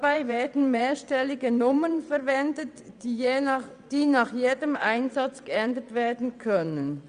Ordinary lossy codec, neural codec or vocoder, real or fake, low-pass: Opus, 64 kbps; vocoder, 22.05 kHz, 80 mel bands, WaveNeXt; fake; 9.9 kHz